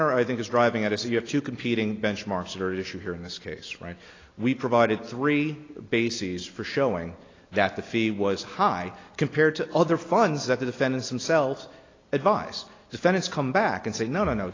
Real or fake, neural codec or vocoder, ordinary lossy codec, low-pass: real; none; AAC, 32 kbps; 7.2 kHz